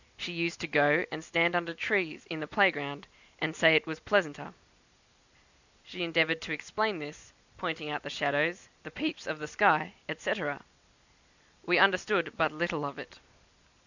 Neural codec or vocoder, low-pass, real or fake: none; 7.2 kHz; real